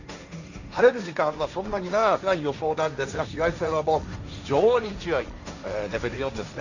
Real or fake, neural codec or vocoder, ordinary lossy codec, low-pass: fake; codec, 16 kHz, 1.1 kbps, Voila-Tokenizer; none; 7.2 kHz